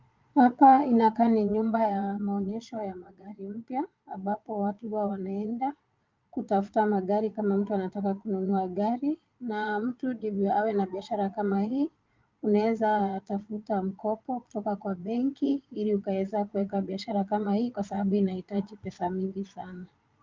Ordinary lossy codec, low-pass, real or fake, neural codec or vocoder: Opus, 24 kbps; 7.2 kHz; fake; vocoder, 22.05 kHz, 80 mel bands, Vocos